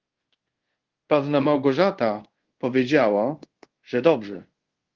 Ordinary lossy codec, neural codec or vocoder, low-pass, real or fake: Opus, 16 kbps; codec, 24 kHz, 0.5 kbps, DualCodec; 7.2 kHz; fake